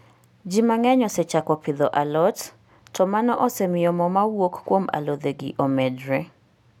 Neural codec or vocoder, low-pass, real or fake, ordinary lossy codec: none; 19.8 kHz; real; none